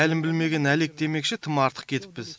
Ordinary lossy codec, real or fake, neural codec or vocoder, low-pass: none; real; none; none